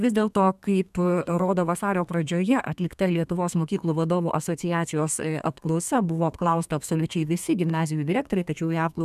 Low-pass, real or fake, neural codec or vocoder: 14.4 kHz; fake; codec, 32 kHz, 1.9 kbps, SNAC